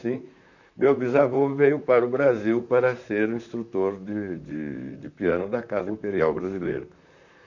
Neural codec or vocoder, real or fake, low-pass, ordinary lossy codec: vocoder, 44.1 kHz, 128 mel bands, Pupu-Vocoder; fake; 7.2 kHz; none